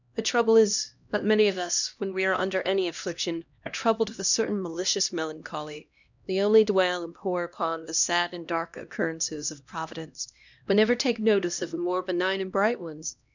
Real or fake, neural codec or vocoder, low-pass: fake; codec, 16 kHz, 1 kbps, X-Codec, HuBERT features, trained on LibriSpeech; 7.2 kHz